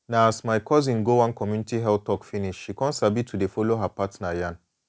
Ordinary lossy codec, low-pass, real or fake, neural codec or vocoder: none; none; real; none